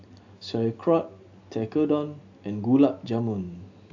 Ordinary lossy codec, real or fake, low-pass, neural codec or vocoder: AAC, 48 kbps; real; 7.2 kHz; none